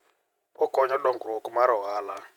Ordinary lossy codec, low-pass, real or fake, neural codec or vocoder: MP3, 96 kbps; 19.8 kHz; fake; vocoder, 48 kHz, 128 mel bands, Vocos